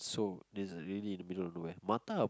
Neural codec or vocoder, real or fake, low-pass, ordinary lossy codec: none; real; none; none